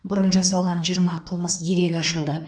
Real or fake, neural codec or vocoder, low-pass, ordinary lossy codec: fake; codec, 24 kHz, 1 kbps, SNAC; 9.9 kHz; none